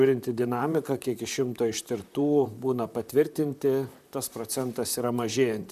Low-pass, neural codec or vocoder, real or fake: 14.4 kHz; vocoder, 44.1 kHz, 128 mel bands, Pupu-Vocoder; fake